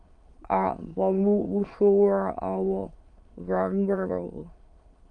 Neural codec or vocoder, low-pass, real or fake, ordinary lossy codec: autoencoder, 22.05 kHz, a latent of 192 numbers a frame, VITS, trained on many speakers; 9.9 kHz; fake; Opus, 32 kbps